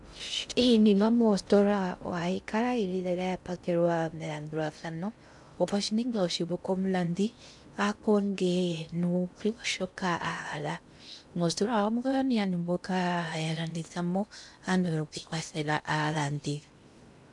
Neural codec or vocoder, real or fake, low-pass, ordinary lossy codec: codec, 16 kHz in and 24 kHz out, 0.6 kbps, FocalCodec, streaming, 2048 codes; fake; 10.8 kHz; MP3, 96 kbps